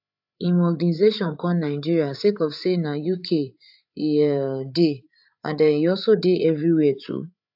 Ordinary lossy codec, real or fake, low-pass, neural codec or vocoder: AAC, 48 kbps; fake; 5.4 kHz; codec, 16 kHz, 8 kbps, FreqCodec, larger model